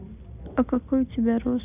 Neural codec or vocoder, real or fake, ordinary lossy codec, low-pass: none; real; none; 3.6 kHz